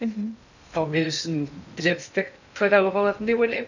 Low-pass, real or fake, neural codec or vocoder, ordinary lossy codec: 7.2 kHz; fake; codec, 16 kHz in and 24 kHz out, 0.6 kbps, FocalCodec, streaming, 2048 codes; none